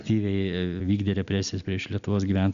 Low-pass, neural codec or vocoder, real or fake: 7.2 kHz; none; real